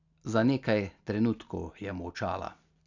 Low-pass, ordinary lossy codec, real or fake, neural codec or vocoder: 7.2 kHz; none; real; none